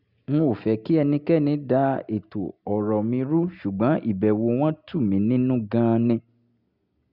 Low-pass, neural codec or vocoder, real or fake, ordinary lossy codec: 5.4 kHz; none; real; Opus, 64 kbps